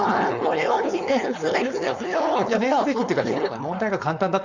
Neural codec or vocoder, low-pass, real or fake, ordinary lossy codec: codec, 16 kHz, 4.8 kbps, FACodec; 7.2 kHz; fake; Opus, 64 kbps